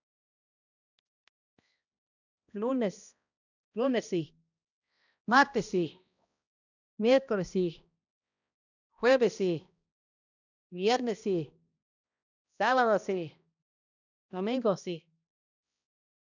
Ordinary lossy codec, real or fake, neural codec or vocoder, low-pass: none; fake; codec, 16 kHz, 1 kbps, X-Codec, HuBERT features, trained on balanced general audio; 7.2 kHz